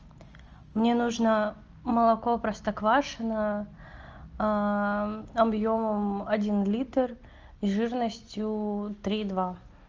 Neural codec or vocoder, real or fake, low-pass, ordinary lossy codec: none; real; 7.2 kHz; Opus, 24 kbps